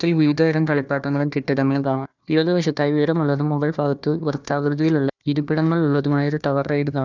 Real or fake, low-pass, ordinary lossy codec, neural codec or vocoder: fake; 7.2 kHz; none; codec, 16 kHz, 1 kbps, FunCodec, trained on Chinese and English, 50 frames a second